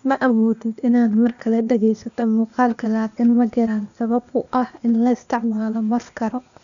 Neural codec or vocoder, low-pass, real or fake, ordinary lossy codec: codec, 16 kHz, 0.8 kbps, ZipCodec; 7.2 kHz; fake; none